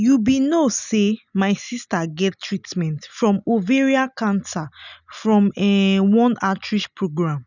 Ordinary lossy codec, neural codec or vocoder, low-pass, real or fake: none; none; 7.2 kHz; real